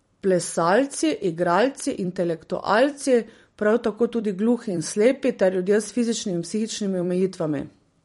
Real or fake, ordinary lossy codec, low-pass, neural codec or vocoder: fake; MP3, 48 kbps; 19.8 kHz; vocoder, 44.1 kHz, 128 mel bands every 256 samples, BigVGAN v2